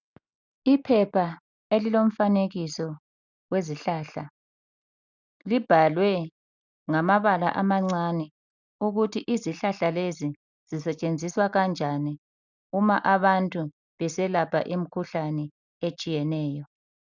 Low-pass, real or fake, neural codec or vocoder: 7.2 kHz; real; none